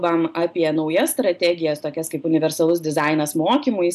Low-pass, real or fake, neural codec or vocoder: 14.4 kHz; real; none